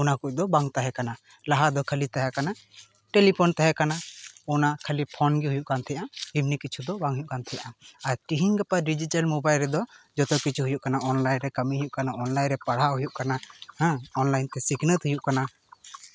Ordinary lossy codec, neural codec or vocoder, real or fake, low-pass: none; none; real; none